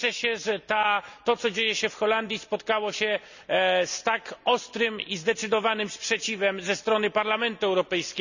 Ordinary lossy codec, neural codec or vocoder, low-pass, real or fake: none; none; 7.2 kHz; real